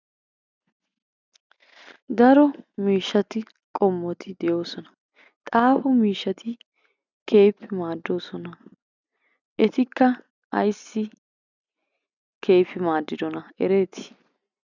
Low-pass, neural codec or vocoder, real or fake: 7.2 kHz; none; real